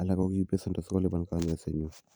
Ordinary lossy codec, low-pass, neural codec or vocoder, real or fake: none; none; vocoder, 44.1 kHz, 128 mel bands every 512 samples, BigVGAN v2; fake